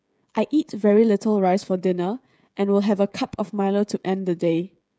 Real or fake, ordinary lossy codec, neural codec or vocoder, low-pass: fake; none; codec, 16 kHz, 16 kbps, FreqCodec, smaller model; none